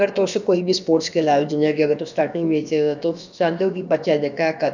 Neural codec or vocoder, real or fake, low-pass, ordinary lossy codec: codec, 16 kHz, about 1 kbps, DyCAST, with the encoder's durations; fake; 7.2 kHz; none